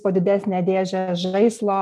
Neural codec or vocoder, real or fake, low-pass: none; real; 14.4 kHz